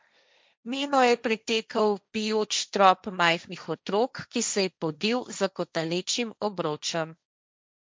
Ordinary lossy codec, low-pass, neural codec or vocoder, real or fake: none; none; codec, 16 kHz, 1.1 kbps, Voila-Tokenizer; fake